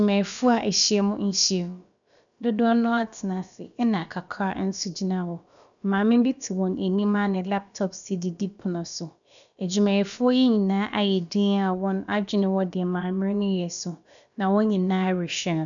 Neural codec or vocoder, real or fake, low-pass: codec, 16 kHz, about 1 kbps, DyCAST, with the encoder's durations; fake; 7.2 kHz